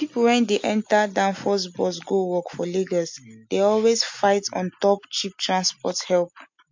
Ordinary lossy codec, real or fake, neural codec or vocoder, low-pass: MP3, 48 kbps; real; none; 7.2 kHz